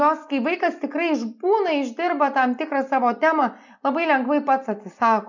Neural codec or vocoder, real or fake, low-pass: none; real; 7.2 kHz